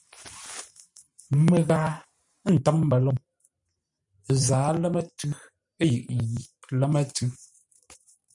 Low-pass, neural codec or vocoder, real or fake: 10.8 kHz; vocoder, 44.1 kHz, 128 mel bands every 512 samples, BigVGAN v2; fake